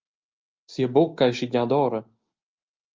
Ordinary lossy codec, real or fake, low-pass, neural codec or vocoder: Opus, 24 kbps; real; 7.2 kHz; none